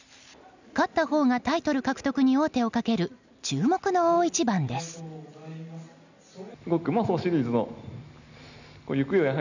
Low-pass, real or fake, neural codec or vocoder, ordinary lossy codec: 7.2 kHz; real; none; none